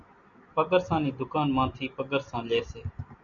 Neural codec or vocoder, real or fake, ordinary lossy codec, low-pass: none; real; MP3, 96 kbps; 7.2 kHz